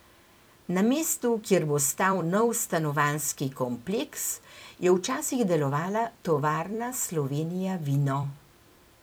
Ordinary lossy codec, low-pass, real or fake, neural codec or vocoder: none; none; fake; vocoder, 44.1 kHz, 128 mel bands every 256 samples, BigVGAN v2